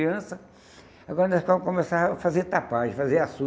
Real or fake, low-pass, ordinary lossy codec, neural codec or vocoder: real; none; none; none